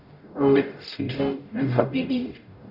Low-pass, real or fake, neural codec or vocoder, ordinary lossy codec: 5.4 kHz; fake; codec, 44.1 kHz, 0.9 kbps, DAC; Opus, 64 kbps